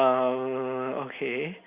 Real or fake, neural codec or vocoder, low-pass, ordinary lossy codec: real; none; 3.6 kHz; Opus, 64 kbps